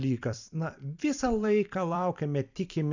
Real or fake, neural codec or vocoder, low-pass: fake; vocoder, 44.1 kHz, 128 mel bands every 256 samples, BigVGAN v2; 7.2 kHz